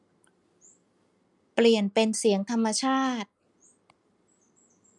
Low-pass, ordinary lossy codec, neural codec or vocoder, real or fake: 10.8 kHz; none; none; real